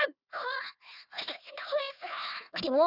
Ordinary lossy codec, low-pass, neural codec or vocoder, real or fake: none; 5.4 kHz; codec, 16 kHz, 1 kbps, FunCodec, trained on Chinese and English, 50 frames a second; fake